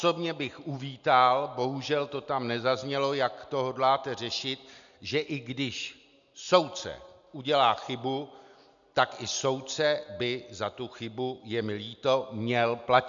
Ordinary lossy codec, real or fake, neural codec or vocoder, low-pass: MP3, 96 kbps; real; none; 7.2 kHz